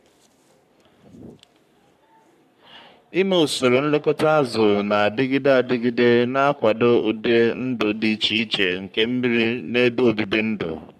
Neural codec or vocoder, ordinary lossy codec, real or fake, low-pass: codec, 44.1 kHz, 3.4 kbps, Pupu-Codec; MP3, 96 kbps; fake; 14.4 kHz